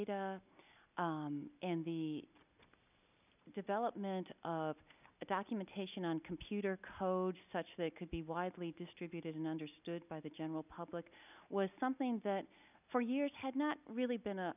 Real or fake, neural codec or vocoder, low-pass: real; none; 3.6 kHz